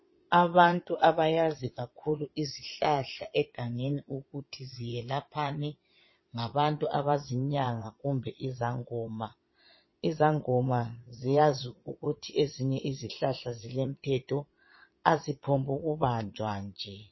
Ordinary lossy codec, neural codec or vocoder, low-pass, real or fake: MP3, 24 kbps; vocoder, 22.05 kHz, 80 mel bands, Vocos; 7.2 kHz; fake